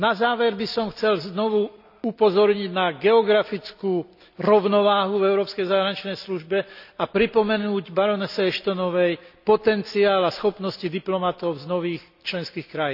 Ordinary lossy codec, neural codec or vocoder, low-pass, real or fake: none; none; 5.4 kHz; real